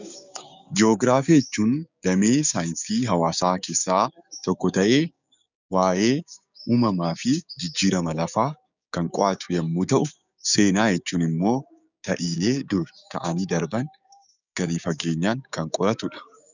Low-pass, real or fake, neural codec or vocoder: 7.2 kHz; fake; codec, 16 kHz, 6 kbps, DAC